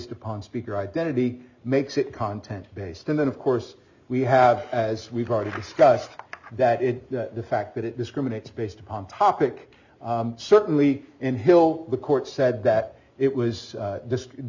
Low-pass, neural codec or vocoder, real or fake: 7.2 kHz; none; real